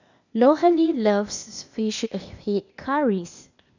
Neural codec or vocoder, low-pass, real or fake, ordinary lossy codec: codec, 16 kHz, 0.8 kbps, ZipCodec; 7.2 kHz; fake; none